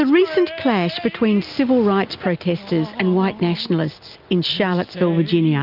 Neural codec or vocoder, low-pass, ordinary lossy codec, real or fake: none; 5.4 kHz; Opus, 24 kbps; real